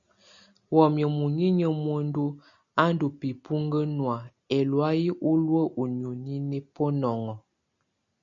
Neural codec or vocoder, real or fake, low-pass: none; real; 7.2 kHz